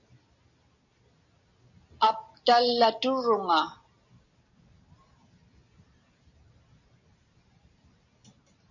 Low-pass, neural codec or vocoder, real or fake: 7.2 kHz; none; real